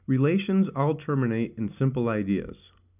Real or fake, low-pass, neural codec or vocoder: real; 3.6 kHz; none